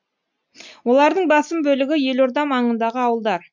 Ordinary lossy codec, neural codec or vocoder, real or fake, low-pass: MP3, 64 kbps; none; real; 7.2 kHz